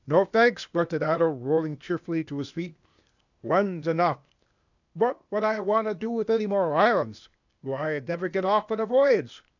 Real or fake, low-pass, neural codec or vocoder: fake; 7.2 kHz; codec, 16 kHz, 0.8 kbps, ZipCodec